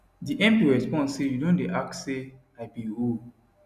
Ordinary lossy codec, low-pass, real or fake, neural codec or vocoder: none; 14.4 kHz; real; none